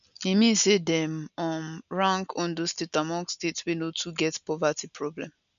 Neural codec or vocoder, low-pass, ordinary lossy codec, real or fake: none; 7.2 kHz; none; real